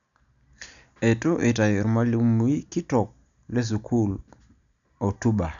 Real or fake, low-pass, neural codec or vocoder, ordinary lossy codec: real; 7.2 kHz; none; none